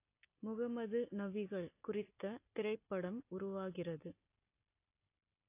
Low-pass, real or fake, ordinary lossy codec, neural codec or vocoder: 3.6 kHz; real; AAC, 24 kbps; none